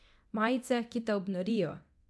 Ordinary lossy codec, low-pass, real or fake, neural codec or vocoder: none; none; fake; codec, 24 kHz, 0.9 kbps, DualCodec